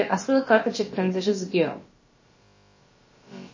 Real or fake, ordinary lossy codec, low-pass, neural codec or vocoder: fake; MP3, 32 kbps; 7.2 kHz; codec, 16 kHz, about 1 kbps, DyCAST, with the encoder's durations